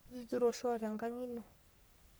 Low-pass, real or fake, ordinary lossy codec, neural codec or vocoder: none; fake; none; codec, 44.1 kHz, 3.4 kbps, Pupu-Codec